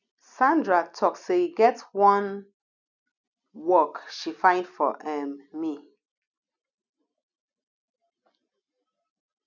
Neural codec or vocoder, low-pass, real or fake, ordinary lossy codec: none; 7.2 kHz; real; none